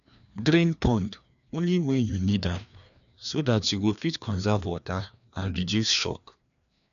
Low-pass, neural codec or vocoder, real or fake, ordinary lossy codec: 7.2 kHz; codec, 16 kHz, 2 kbps, FreqCodec, larger model; fake; none